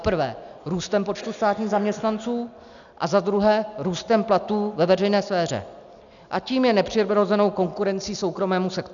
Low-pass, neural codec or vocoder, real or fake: 7.2 kHz; none; real